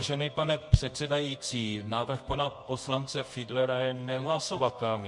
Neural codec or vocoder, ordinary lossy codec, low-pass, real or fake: codec, 24 kHz, 0.9 kbps, WavTokenizer, medium music audio release; MP3, 48 kbps; 10.8 kHz; fake